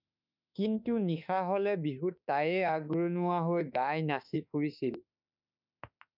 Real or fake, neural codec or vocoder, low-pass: fake; autoencoder, 48 kHz, 32 numbers a frame, DAC-VAE, trained on Japanese speech; 5.4 kHz